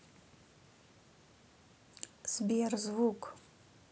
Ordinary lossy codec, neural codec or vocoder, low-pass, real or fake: none; none; none; real